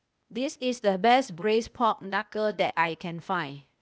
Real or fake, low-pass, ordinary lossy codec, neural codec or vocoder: fake; none; none; codec, 16 kHz, 0.8 kbps, ZipCodec